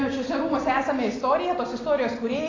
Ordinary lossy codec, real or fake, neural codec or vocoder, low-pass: AAC, 32 kbps; real; none; 7.2 kHz